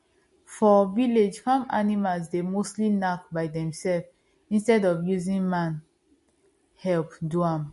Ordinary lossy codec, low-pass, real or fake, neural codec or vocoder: MP3, 48 kbps; 14.4 kHz; real; none